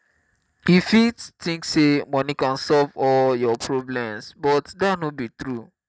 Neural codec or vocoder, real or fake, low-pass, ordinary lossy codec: none; real; none; none